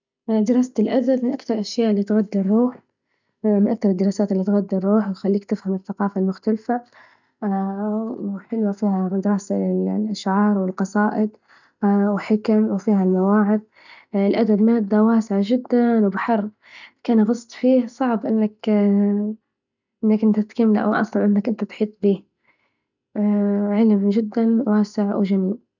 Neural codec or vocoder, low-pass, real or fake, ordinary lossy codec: none; 7.2 kHz; real; none